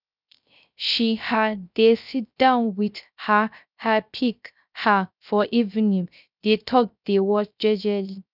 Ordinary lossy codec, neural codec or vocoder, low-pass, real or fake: none; codec, 16 kHz, 0.3 kbps, FocalCodec; 5.4 kHz; fake